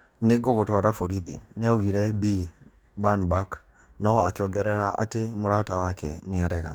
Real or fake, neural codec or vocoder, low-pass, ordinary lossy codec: fake; codec, 44.1 kHz, 2.6 kbps, DAC; none; none